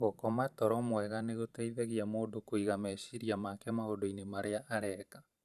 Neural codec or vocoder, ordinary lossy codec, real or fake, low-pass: vocoder, 44.1 kHz, 128 mel bands every 512 samples, BigVGAN v2; none; fake; 14.4 kHz